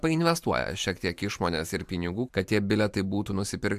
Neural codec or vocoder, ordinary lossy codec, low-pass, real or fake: vocoder, 44.1 kHz, 128 mel bands every 512 samples, BigVGAN v2; AAC, 96 kbps; 14.4 kHz; fake